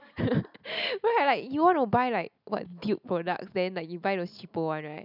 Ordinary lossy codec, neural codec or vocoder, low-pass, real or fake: none; none; 5.4 kHz; real